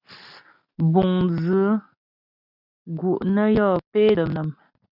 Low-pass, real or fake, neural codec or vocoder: 5.4 kHz; real; none